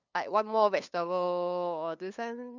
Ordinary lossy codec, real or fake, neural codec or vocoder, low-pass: none; fake; codec, 16 kHz, 2 kbps, FunCodec, trained on LibriTTS, 25 frames a second; 7.2 kHz